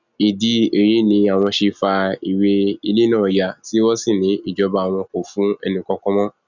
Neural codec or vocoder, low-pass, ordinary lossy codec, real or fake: none; 7.2 kHz; none; real